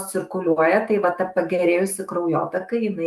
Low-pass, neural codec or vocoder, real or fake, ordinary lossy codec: 14.4 kHz; autoencoder, 48 kHz, 128 numbers a frame, DAC-VAE, trained on Japanese speech; fake; Opus, 32 kbps